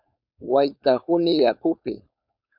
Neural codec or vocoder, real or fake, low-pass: codec, 16 kHz, 4.8 kbps, FACodec; fake; 5.4 kHz